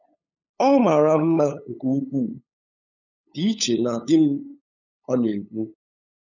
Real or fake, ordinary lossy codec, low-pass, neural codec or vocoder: fake; none; 7.2 kHz; codec, 16 kHz, 8 kbps, FunCodec, trained on LibriTTS, 25 frames a second